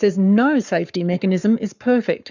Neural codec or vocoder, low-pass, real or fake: codec, 44.1 kHz, 7.8 kbps, Pupu-Codec; 7.2 kHz; fake